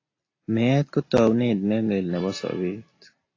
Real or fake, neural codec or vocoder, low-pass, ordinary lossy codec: real; none; 7.2 kHz; AAC, 32 kbps